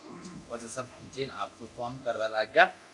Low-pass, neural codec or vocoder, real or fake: 10.8 kHz; codec, 24 kHz, 0.9 kbps, DualCodec; fake